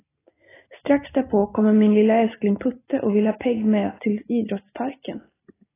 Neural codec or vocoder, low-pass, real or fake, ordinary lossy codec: none; 3.6 kHz; real; AAC, 16 kbps